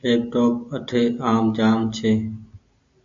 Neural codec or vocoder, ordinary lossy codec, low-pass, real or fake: none; AAC, 64 kbps; 7.2 kHz; real